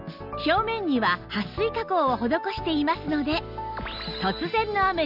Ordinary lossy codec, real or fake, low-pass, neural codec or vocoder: none; real; 5.4 kHz; none